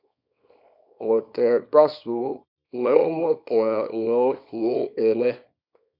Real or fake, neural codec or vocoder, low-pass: fake; codec, 24 kHz, 0.9 kbps, WavTokenizer, small release; 5.4 kHz